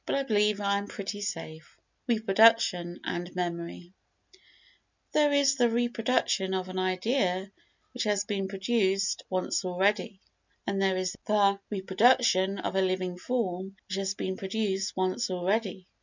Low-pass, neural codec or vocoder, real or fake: 7.2 kHz; none; real